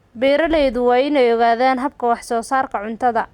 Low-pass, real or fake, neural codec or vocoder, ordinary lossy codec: 19.8 kHz; real; none; Opus, 64 kbps